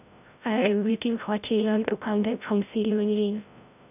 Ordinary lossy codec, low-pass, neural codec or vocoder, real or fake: none; 3.6 kHz; codec, 16 kHz, 0.5 kbps, FreqCodec, larger model; fake